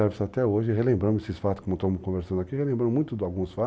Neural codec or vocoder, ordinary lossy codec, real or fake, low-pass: none; none; real; none